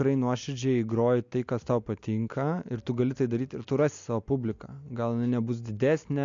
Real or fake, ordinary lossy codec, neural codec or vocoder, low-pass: real; MP3, 64 kbps; none; 7.2 kHz